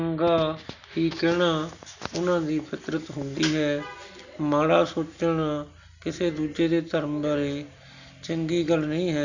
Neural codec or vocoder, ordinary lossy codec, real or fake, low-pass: none; none; real; 7.2 kHz